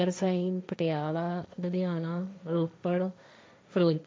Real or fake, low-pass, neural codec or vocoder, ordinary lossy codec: fake; none; codec, 16 kHz, 1.1 kbps, Voila-Tokenizer; none